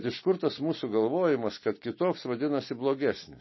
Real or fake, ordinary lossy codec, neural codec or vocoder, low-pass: real; MP3, 24 kbps; none; 7.2 kHz